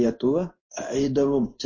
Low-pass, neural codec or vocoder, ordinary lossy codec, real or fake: 7.2 kHz; codec, 16 kHz in and 24 kHz out, 1 kbps, XY-Tokenizer; MP3, 32 kbps; fake